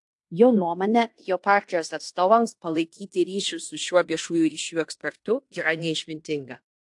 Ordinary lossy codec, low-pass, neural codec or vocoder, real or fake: AAC, 64 kbps; 10.8 kHz; codec, 16 kHz in and 24 kHz out, 0.9 kbps, LongCat-Audio-Codec, fine tuned four codebook decoder; fake